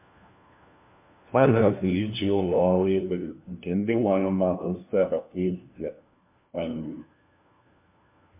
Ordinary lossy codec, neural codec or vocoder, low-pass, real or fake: MP3, 32 kbps; codec, 16 kHz, 1 kbps, FunCodec, trained on LibriTTS, 50 frames a second; 3.6 kHz; fake